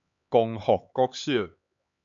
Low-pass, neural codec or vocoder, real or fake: 7.2 kHz; codec, 16 kHz, 4 kbps, X-Codec, HuBERT features, trained on LibriSpeech; fake